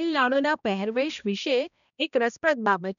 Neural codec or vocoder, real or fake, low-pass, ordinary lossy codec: codec, 16 kHz, 1 kbps, X-Codec, HuBERT features, trained on balanced general audio; fake; 7.2 kHz; none